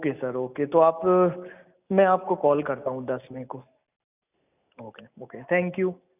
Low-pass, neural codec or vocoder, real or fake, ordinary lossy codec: 3.6 kHz; none; real; none